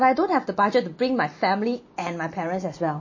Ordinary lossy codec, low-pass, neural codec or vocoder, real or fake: MP3, 32 kbps; 7.2 kHz; none; real